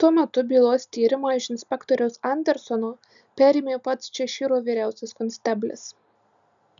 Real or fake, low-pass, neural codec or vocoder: real; 7.2 kHz; none